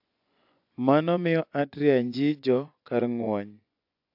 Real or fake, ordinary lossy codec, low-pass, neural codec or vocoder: fake; AAC, 48 kbps; 5.4 kHz; vocoder, 24 kHz, 100 mel bands, Vocos